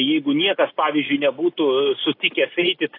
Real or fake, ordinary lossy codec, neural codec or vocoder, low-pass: real; AAC, 32 kbps; none; 5.4 kHz